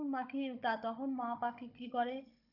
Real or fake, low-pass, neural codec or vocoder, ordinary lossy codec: fake; 5.4 kHz; codec, 16 kHz, 16 kbps, FunCodec, trained on Chinese and English, 50 frames a second; none